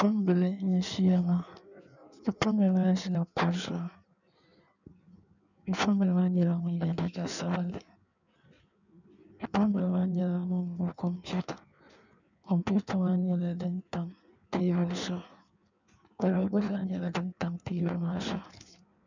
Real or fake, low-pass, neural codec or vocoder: fake; 7.2 kHz; codec, 16 kHz in and 24 kHz out, 1.1 kbps, FireRedTTS-2 codec